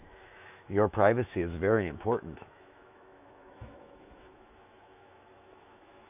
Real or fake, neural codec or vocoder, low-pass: fake; autoencoder, 48 kHz, 128 numbers a frame, DAC-VAE, trained on Japanese speech; 3.6 kHz